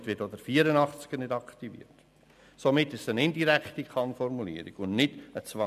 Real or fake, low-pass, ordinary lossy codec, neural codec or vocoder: real; 14.4 kHz; none; none